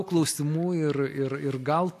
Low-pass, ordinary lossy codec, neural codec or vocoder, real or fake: 14.4 kHz; AAC, 64 kbps; none; real